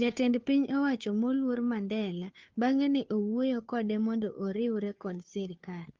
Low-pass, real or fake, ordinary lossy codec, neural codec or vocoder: 7.2 kHz; fake; Opus, 32 kbps; codec, 16 kHz, 2 kbps, FunCodec, trained on Chinese and English, 25 frames a second